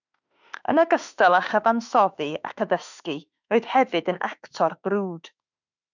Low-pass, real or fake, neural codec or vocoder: 7.2 kHz; fake; autoencoder, 48 kHz, 32 numbers a frame, DAC-VAE, trained on Japanese speech